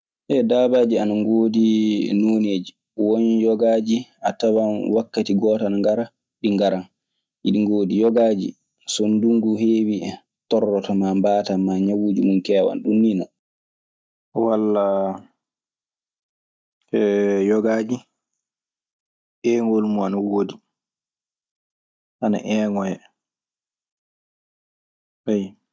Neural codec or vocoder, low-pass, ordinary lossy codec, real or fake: none; none; none; real